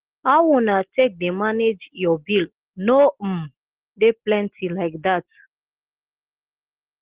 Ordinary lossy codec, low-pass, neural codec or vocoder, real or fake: Opus, 16 kbps; 3.6 kHz; none; real